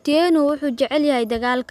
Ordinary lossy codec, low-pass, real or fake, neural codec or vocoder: none; 14.4 kHz; real; none